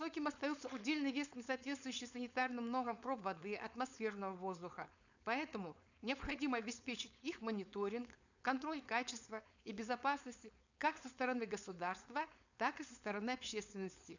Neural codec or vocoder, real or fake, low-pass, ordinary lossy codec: codec, 16 kHz, 4.8 kbps, FACodec; fake; 7.2 kHz; none